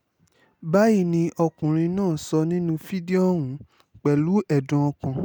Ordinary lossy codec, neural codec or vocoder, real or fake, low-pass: none; none; real; none